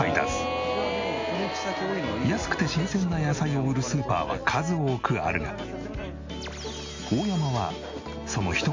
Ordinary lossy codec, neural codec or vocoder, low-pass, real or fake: MP3, 48 kbps; none; 7.2 kHz; real